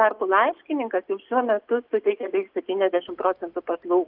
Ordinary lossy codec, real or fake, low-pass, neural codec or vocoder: Opus, 24 kbps; real; 5.4 kHz; none